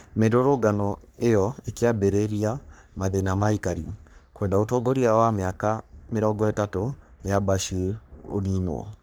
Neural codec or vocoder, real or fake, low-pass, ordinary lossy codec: codec, 44.1 kHz, 3.4 kbps, Pupu-Codec; fake; none; none